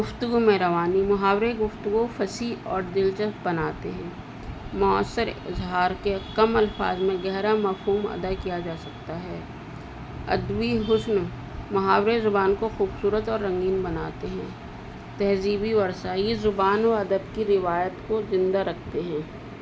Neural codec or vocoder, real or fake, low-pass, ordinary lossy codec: none; real; none; none